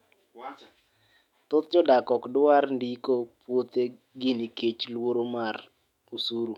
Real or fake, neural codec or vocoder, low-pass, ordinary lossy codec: fake; autoencoder, 48 kHz, 128 numbers a frame, DAC-VAE, trained on Japanese speech; 19.8 kHz; MP3, 96 kbps